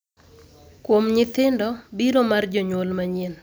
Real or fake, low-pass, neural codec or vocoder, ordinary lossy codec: real; none; none; none